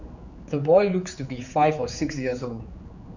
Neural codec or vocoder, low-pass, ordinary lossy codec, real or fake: codec, 16 kHz, 4 kbps, X-Codec, HuBERT features, trained on general audio; 7.2 kHz; none; fake